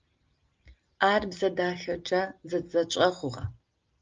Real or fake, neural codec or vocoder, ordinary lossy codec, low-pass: real; none; Opus, 24 kbps; 7.2 kHz